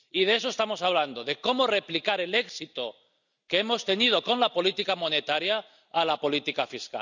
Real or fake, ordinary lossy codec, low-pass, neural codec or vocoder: real; none; 7.2 kHz; none